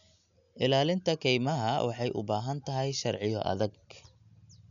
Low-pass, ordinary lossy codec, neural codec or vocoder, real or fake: 7.2 kHz; none; none; real